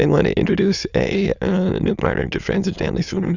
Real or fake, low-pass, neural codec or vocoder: fake; 7.2 kHz; autoencoder, 22.05 kHz, a latent of 192 numbers a frame, VITS, trained on many speakers